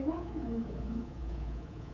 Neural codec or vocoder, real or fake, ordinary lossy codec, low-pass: codec, 24 kHz, 3.1 kbps, DualCodec; fake; MP3, 48 kbps; 7.2 kHz